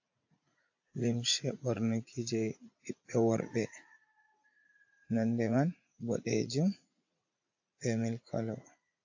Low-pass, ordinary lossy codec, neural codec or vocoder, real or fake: 7.2 kHz; AAC, 48 kbps; vocoder, 44.1 kHz, 80 mel bands, Vocos; fake